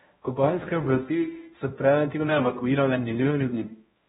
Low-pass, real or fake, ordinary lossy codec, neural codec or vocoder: 7.2 kHz; fake; AAC, 16 kbps; codec, 16 kHz, 0.5 kbps, X-Codec, HuBERT features, trained on balanced general audio